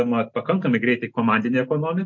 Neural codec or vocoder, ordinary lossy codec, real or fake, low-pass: none; MP3, 48 kbps; real; 7.2 kHz